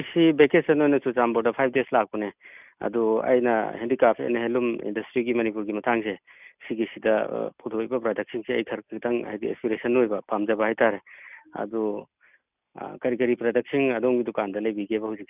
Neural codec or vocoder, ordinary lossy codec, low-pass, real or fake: none; none; 3.6 kHz; real